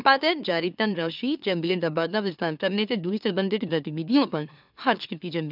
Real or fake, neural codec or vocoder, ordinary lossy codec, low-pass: fake; autoencoder, 44.1 kHz, a latent of 192 numbers a frame, MeloTTS; none; 5.4 kHz